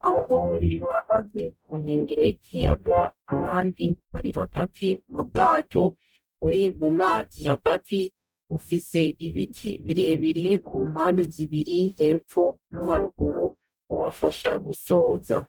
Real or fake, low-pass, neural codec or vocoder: fake; 19.8 kHz; codec, 44.1 kHz, 0.9 kbps, DAC